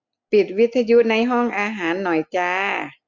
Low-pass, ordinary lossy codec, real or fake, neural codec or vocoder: 7.2 kHz; MP3, 64 kbps; real; none